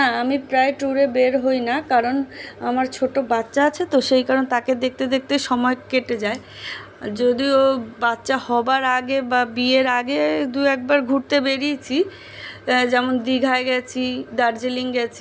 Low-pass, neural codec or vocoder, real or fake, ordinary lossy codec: none; none; real; none